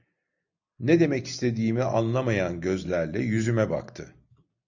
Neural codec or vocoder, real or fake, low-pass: none; real; 7.2 kHz